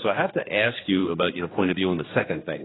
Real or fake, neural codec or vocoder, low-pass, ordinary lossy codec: fake; codec, 16 kHz, 2 kbps, X-Codec, HuBERT features, trained on general audio; 7.2 kHz; AAC, 16 kbps